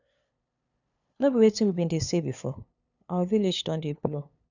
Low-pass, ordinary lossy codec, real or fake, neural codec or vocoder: 7.2 kHz; none; fake; codec, 16 kHz, 2 kbps, FunCodec, trained on LibriTTS, 25 frames a second